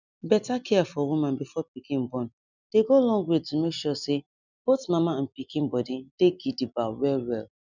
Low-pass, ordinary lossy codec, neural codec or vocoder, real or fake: 7.2 kHz; none; none; real